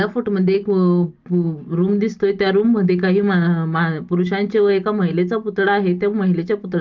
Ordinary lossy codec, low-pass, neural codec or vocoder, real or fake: Opus, 32 kbps; 7.2 kHz; none; real